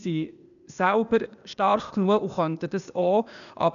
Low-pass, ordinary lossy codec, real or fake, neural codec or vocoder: 7.2 kHz; none; fake; codec, 16 kHz, 0.8 kbps, ZipCodec